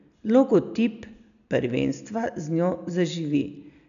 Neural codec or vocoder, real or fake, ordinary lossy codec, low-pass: none; real; none; 7.2 kHz